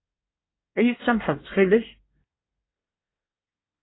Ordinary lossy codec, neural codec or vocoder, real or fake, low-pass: AAC, 16 kbps; codec, 24 kHz, 1 kbps, SNAC; fake; 7.2 kHz